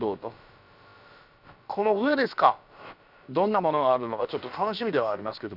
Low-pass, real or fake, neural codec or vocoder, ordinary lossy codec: 5.4 kHz; fake; codec, 16 kHz, about 1 kbps, DyCAST, with the encoder's durations; none